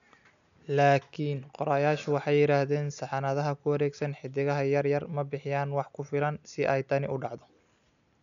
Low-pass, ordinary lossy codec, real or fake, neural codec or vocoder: 7.2 kHz; none; real; none